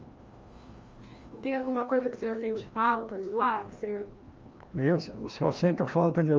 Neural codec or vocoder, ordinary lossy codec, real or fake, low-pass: codec, 16 kHz, 1 kbps, FreqCodec, larger model; Opus, 32 kbps; fake; 7.2 kHz